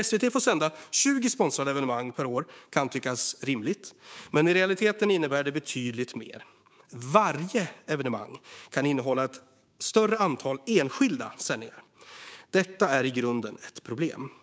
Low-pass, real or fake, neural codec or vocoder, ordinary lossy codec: none; fake; codec, 16 kHz, 6 kbps, DAC; none